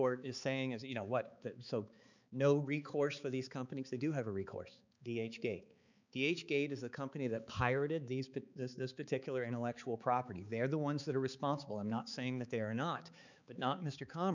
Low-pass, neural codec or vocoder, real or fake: 7.2 kHz; codec, 16 kHz, 4 kbps, X-Codec, HuBERT features, trained on balanced general audio; fake